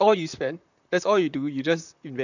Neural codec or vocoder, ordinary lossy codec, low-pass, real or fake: vocoder, 44.1 kHz, 128 mel bands, Pupu-Vocoder; none; 7.2 kHz; fake